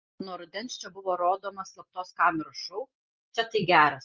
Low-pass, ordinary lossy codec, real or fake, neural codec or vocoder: 7.2 kHz; Opus, 24 kbps; real; none